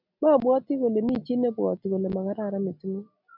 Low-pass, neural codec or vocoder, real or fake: 5.4 kHz; none; real